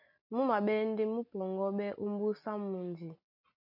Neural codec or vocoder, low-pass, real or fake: none; 5.4 kHz; real